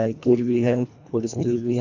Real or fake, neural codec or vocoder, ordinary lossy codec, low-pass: fake; codec, 24 kHz, 1.5 kbps, HILCodec; AAC, 48 kbps; 7.2 kHz